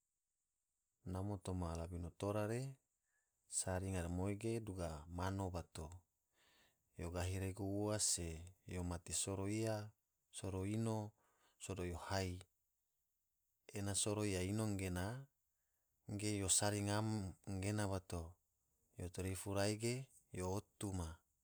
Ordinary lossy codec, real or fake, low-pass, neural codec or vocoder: none; real; none; none